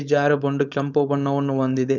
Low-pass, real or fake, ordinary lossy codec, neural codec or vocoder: 7.2 kHz; fake; none; codec, 16 kHz, 4.8 kbps, FACodec